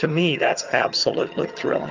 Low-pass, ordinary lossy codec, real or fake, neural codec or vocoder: 7.2 kHz; Opus, 32 kbps; fake; vocoder, 22.05 kHz, 80 mel bands, HiFi-GAN